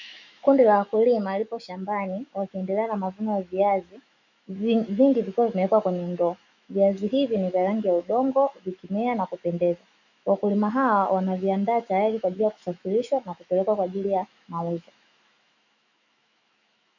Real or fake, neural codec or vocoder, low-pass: fake; autoencoder, 48 kHz, 128 numbers a frame, DAC-VAE, trained on Japanese speech; 7.2 kHz